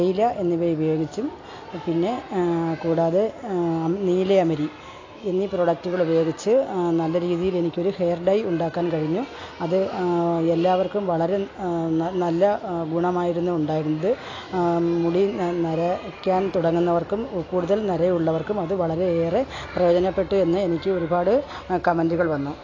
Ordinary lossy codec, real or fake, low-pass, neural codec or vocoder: AAC, 32 kbps; real; 7.2 kHz; none